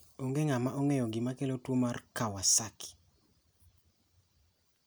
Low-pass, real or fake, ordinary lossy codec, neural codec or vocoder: none; real; none; none